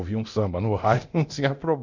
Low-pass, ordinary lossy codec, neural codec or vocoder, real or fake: 7.2 kHz; none; codec, 24 kHz, 0.9 kbps, DualCodec; fake